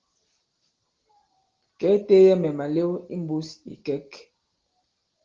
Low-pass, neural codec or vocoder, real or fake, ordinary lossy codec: 7.2 kHz; none; real; Opus, 16 kbps